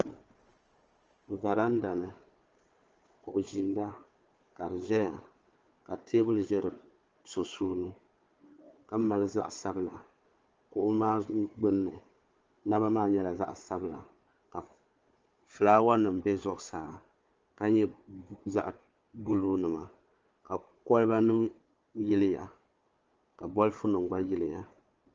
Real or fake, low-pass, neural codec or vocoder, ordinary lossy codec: fake; 7.2 kHz; codec, 16 kHz, 4 kbps, FunCodec, trained on Chinese and English, 50 frames a second; Opus, 24 kbps